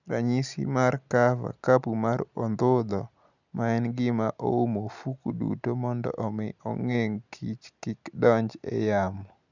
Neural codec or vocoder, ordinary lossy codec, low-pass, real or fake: none; none; 7.2 kHz; real